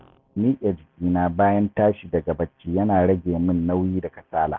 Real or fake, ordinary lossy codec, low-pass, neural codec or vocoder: real; Opus, 32 kbps; 7.2 kHz; none